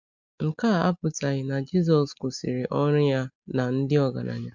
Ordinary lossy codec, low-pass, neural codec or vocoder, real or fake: MP3, 64 kbps; 7.2 kHz; none; real